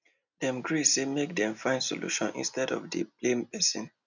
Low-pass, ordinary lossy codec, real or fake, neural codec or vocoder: 7.2 kHz; none; real; none